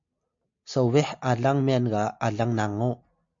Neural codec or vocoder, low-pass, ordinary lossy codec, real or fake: none; 7.2 kHz; MP3, 48 kbps; real